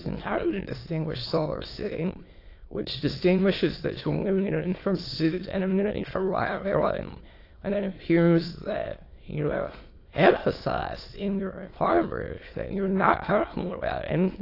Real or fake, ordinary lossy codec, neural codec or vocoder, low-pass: fake; AAC, 32 kbps; autoencoder, 22.05 kHz, a latent of 192 numbers a frame, VITS, trained on many speakers; 5.4 kHz